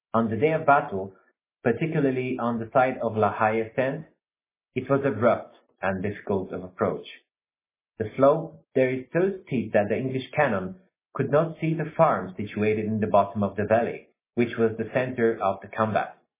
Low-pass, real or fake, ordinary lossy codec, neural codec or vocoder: 3.6 kHz; real; MP3, 16 kbps; none